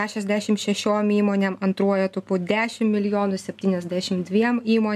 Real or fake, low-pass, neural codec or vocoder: real; 14.4 kHz; none